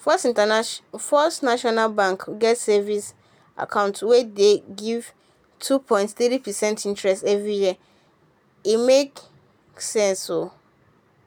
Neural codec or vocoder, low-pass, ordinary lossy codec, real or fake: none; none; none; real